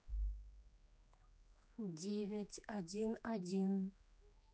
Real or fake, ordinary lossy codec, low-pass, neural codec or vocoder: fake; none; none; codec, 16 kHz, 2 kbps, X-Codec, HuBERT features, trained on general audio